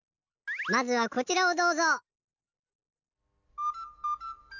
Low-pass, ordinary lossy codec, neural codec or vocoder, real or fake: 7.2 kHz; none; none; real